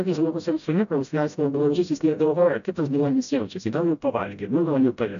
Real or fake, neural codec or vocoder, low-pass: fake; codec, 16 kHz, 0.5 kbps, FreqCodec, smaller model; 7.2 kHz